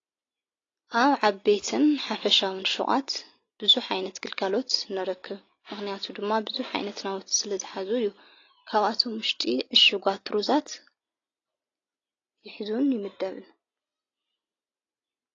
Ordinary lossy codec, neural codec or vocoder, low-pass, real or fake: AAC, 32 kbps; none; 7.2 kHz; real